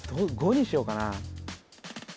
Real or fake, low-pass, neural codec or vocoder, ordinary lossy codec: real; none; none; none